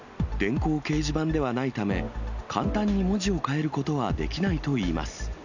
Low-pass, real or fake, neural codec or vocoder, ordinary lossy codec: 7.2 kHz; real; none; none